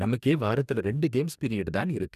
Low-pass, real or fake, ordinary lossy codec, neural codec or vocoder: 14.4 kHz; fake; none; codec, 44.1 kHz, 2.6 kbps, DAC